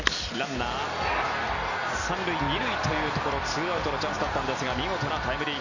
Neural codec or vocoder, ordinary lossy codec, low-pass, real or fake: none; none; 7.2 kHz; real